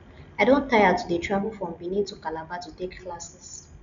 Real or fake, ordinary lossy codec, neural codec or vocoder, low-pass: real; none; none; 7.2 kHz